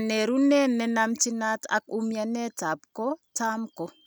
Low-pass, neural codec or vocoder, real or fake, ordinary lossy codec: none; none; real; none